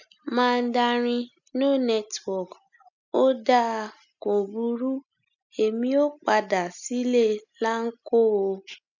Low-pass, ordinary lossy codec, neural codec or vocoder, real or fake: 7.2 kHz; none; none; real